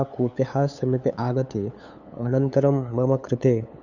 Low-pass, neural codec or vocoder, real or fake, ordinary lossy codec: 7.2 kHz; codec, 16 kHz, 8 kbps, FunCodec, trained on LibriTTS, 25 frames a second; fake; none